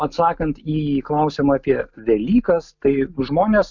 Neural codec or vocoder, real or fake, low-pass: none; real; 7.2 kHz